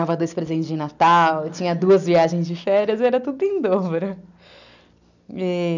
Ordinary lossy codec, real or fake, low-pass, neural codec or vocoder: none; real; 7.2 kHz; none